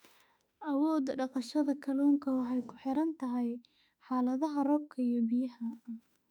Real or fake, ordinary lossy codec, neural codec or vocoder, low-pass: fake; none; autoencoder, 48 kHz, 32 numbers a frame, DAC-VAE, trained on Japanese speech; 19.8 kHz